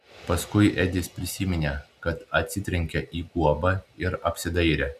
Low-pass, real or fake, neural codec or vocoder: 14.4 kHz; real; none